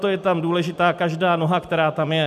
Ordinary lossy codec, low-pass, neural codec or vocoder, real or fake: MP3, 96 kbps; 14.4 kHz; none; real